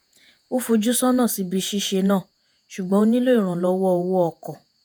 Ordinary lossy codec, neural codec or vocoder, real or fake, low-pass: none; vocoder, 48 kHz, 128 mel bands, Vocos; fake; none